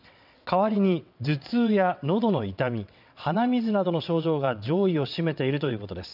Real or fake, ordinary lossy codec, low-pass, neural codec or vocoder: fake; none; 5.4 kHz; vocoder, 22.05 kHz, 80 mel bands, WaveNeXt